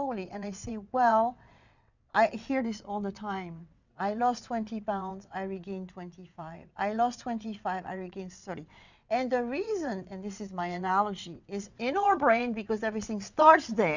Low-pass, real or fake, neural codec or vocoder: 7.2 kHz; fake; vocoder, 22.05 kHz, 80 mel bands, WaveNeXt